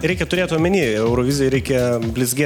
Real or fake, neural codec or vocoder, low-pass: real; none; 19.8 kHz